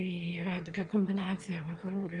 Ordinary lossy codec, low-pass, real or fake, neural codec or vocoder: Opus, 32 kbps; 9.9 kHz; fake; autoencoder, 22.05 kHz, a latent of 192 numbers a frame, VITS, trained on one speaker